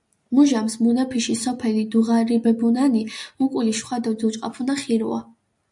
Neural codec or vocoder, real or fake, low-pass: none; real; 10.8 kHz